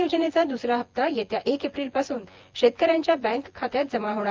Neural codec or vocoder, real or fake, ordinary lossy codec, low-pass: vocoder, 24 kHz, 100 mel bands, Vocos; fake; Opus, 32 kbps; 7.2 kHz